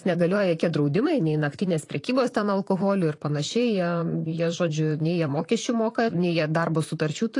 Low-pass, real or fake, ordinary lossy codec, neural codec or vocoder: 10.8 kHz; fake; AAC, 48 kbps; vocoder, 44.1 kHz, 128 mel bands, Pupu-Vocoder